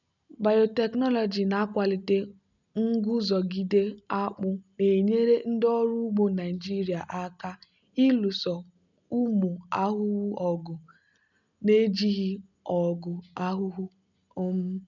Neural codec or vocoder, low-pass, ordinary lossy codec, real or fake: none; 7.2 kHz; none; real